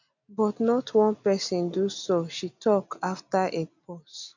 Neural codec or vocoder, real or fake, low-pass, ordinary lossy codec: none; real; 7.2 kHz; none